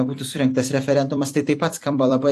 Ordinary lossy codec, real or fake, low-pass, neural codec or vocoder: AAC, 64 kbps; fake; 14.4 kHz; vocoder, 44.1 kHz, 128 mel bands every 256 samples, BigVGAN v2